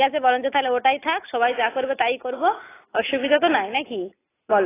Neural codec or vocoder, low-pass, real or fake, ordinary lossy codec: none; 3.6 kHz; real; AAC, 16 kbps